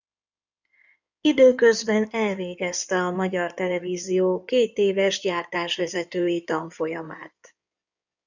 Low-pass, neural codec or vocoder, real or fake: 7.2 kHz; codec, 16 kHz in and 24 kHz out, 2.2 kbps, FireRedTTS-2 codec; fake